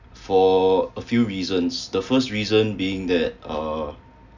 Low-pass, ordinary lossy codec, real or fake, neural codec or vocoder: 7.2 kHz; none; real; none